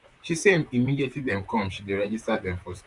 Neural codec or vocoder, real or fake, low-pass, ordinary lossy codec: vocoder, 44.1 kHz, 128 mel bands, Pupu-Vocoder; fake; 10.8 kHz; none